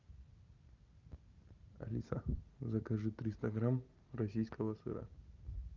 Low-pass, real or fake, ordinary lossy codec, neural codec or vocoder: 7.2 kHz; real; Opus, 24 kbps; none